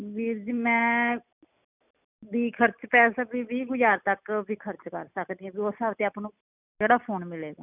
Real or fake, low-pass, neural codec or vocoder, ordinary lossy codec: real; 3.6 kHz; none; none